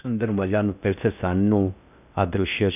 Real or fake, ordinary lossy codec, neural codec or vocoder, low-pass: fake; none; codec, 16 kHz in and 24 kHz out, 0.6 kbps, FocalCodec, streaming, 4096 codes; 3.6 kHz